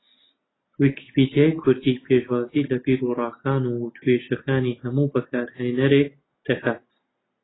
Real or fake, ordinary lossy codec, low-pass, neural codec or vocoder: real; AAC, 16 kbps; 7.2 kHz; none